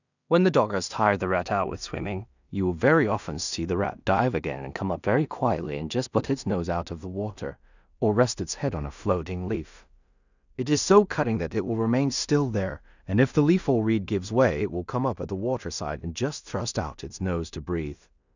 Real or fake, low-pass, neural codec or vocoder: fake; 7.2 kHz; codec, 16 kHz in and 24 kHz out, 0.4 kbps, LongCat-Audio-Codec, two codebook decoder